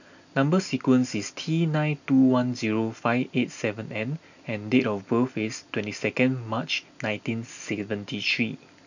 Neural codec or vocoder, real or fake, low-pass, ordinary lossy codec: none; real; 7.2 kHz; none